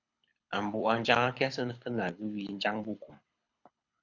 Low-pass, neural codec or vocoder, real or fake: 7.2 kHz; codec, 24 kHz, 6 kbps, HILCodec; fake